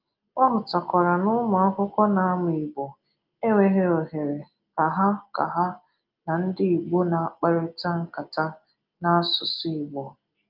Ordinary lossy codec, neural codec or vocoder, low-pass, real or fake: Opus, 24 kbps; none; 5.4 kHz; real